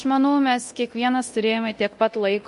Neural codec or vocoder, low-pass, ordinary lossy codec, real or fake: codec, 24 kHz, 0.9 kbps, DualCodec; 10.8 kHz; MP3, 48 kbps; fake